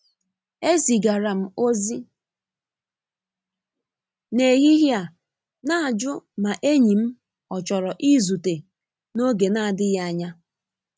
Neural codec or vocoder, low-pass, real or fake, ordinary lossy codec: none; none; real; none